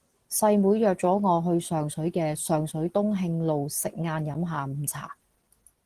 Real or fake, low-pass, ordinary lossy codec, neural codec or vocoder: real; 14.4 kHz; Opus, 16 kbps; none